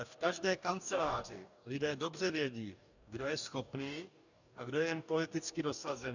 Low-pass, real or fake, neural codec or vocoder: 7.2 kHz; fake; codec, 44.1 kHz, 2.6 kbps, DAC